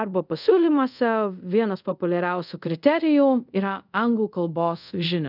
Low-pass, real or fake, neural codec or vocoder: 5.4 kHz; fake; codec, 24 kHz, 0.5 kbps, DualCodec